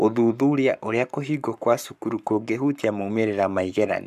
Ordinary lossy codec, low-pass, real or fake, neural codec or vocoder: none; 14.4 kHz; fake; codec, 44.1 kHz, 7.8 kbps, Pupu-Codec